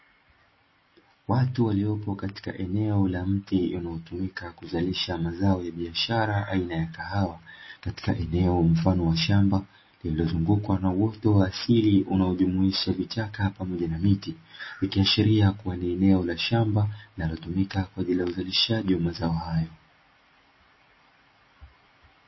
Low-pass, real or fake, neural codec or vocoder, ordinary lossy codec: 7.2 kHz; real; none; MP3, 24 kbps